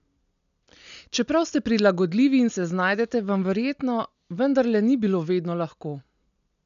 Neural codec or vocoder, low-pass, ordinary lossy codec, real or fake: none; 7.2 kHz; none; real